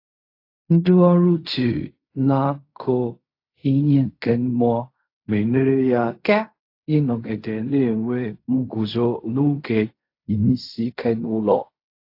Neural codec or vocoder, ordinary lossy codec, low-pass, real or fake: codec, 16 kHz in and 24 kHz out, 0.4 kbps, LongCat-Audio-Codec, fine tuned four codebook decoder; AAC, 32 kbps; 5.4 kHz; fake